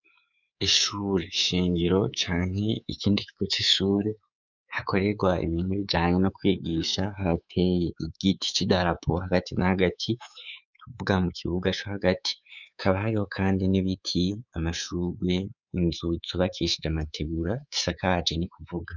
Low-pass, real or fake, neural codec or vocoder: 7.2 kHz; fake; codec, 24 kHz, 3.1 kbps, DualCodec